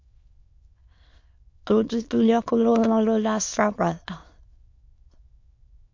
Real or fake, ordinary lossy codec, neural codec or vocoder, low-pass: fake; MP3, 48 kbps; autoencoder, 22.05 kHz, a latent of 192 numbers a frame, VITS, trained on many speakers; 7.2 kHz